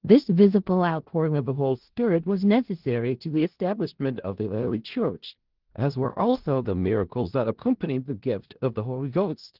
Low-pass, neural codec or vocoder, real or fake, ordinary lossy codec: 5.4 kHz; codec, 16 kHz in and 24 kHz out, 0.4 kbps, LongCat-Audio-Codec, four codebook decoder; fake; Opus, 16 kbps